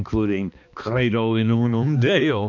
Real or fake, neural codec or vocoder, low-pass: fake; codec, 16 kHz, 2 kbps, X-Codec, HuBERT features, trained on balanced general audio; 7.2 kHz